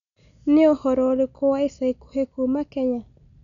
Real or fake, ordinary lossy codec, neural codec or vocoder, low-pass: real; none; none; 7.2 kHz